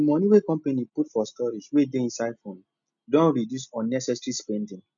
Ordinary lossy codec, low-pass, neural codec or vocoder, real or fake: none; 7.2 kHz; none; real